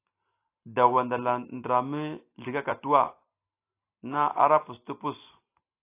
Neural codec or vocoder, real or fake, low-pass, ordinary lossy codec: none; real; 3.6 kHz; AAC, 24 kbps